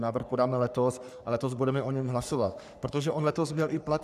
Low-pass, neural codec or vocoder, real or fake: 14.4 kHz; codec, 44.1 kHz, 3.4 kbps, Pupu-Codec; fake